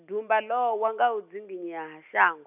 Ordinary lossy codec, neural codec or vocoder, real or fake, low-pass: none; none; real; 3.6 kHz